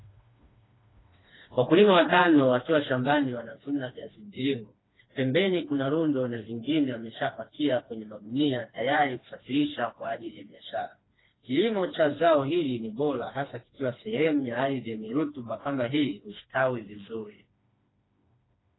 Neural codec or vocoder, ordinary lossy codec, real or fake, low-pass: codec, 16 kHz, 2 kbps, FreqCodec, smaller model; AAC, 16 kbps; fake; 7.2 kHz